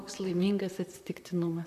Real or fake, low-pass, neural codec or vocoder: fake; 14.4 kHz; vocoder, 44.1 kHz, 128 mel bands, Pupu-Vocoder